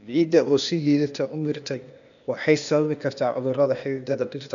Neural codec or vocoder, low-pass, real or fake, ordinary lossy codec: codec, 16 kHz, 0.8 kbps, ZipCodec; 7.2 kHz; fake; none